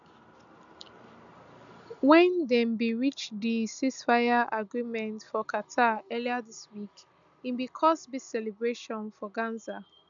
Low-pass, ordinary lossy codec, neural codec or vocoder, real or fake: 7.2 kHz; none; none; real